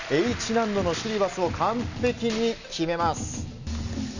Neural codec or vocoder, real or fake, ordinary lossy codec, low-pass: none; real; none; 7.2 kHz